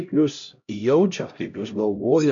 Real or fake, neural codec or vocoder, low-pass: fake; codec, 16 kHz, 0.5 kbps, X-Codec, HuBERT features, trained on LibriSpeech; 7.2 kHz